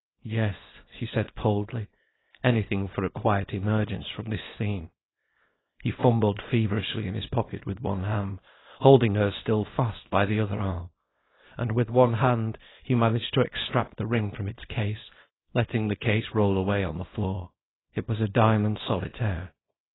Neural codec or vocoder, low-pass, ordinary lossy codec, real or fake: codec, 24 kHz, 0.9 kbps, WavTokenizer, small release; 7.2 kHz; AAC, 16 kbps; fake